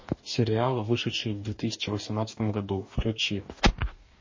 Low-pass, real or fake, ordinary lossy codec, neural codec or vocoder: 7.2 kHz; fake; MP3, 32 kbps; codec, 44.1 kHz, 2.6 kbps, DAC